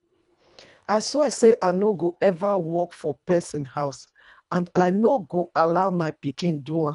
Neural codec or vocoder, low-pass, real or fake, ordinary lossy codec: codec, 24 kHz, 1.5 kbps, HILCodec; 10.8 kHz; fake; none